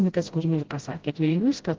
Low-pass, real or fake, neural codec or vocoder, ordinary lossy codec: 7.2 kHz; fake; codec, 16 kHz, 0.5 kbps, FreqCodec, smaller model; Opus, 16 kbps